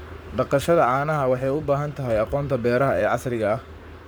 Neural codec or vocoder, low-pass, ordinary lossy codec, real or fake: codec, 44.1 kHz, 7.8 kbps, Pupu-Codec; none; none; fake